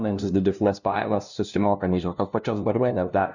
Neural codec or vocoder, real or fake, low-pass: codec, 16 kHz, 0.5 kbps, FunCodec, trained on LibriTTS, 25 frames a second; fake; 7.2 kHz